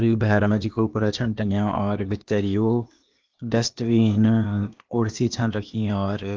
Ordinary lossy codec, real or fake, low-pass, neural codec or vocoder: Opus, 32 kbps; fake; 7.2 kHz; codec, 16 kHz, 0.8 kbps, ZipCodec